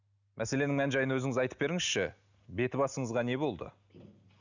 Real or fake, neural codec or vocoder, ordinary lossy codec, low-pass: real; none; none; 7.2 kHz